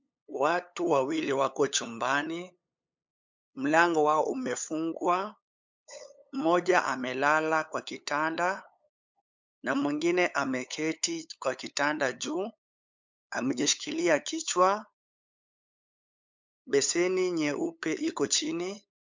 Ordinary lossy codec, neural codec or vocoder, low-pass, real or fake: MP3, 64 kbps; codec, 16 kHz, 8 kbps, FunCodec, trained on LibriTTS, 25 frames a second; 7.2 kHz; fake